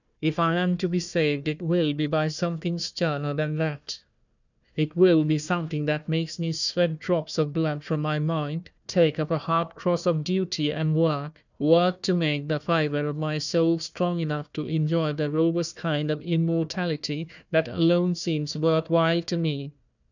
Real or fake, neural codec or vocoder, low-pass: fake; codec, 16 kHz, 1 kbps, FunCodec, trained on Chinese and English, 50 frames a second; 7.2 kHz